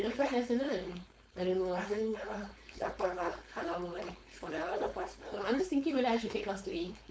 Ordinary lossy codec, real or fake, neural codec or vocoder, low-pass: none; fake; codec, 16 kHz, 4.8 kbps, FACodec; none